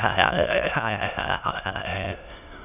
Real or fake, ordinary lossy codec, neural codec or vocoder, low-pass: fake; none; autoencoder, 22.05 kHz, a latent of 192 numbers a frame, VITS, trained on many speakers; 3.6 kHz